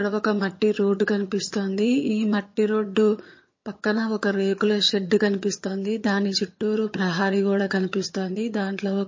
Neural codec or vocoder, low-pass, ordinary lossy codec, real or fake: vocoder, 22.05 kHz, 80 mel bands, HiFi-GAN; 7.2 kHz; MP3, 32 kbps; fake